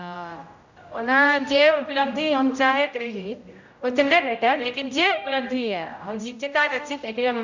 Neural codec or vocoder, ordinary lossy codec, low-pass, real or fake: codec, 16 kHz, 0.5 kbps, X-Codec, HuBERT features, trained on general audio; none; 7.2 kHz; fake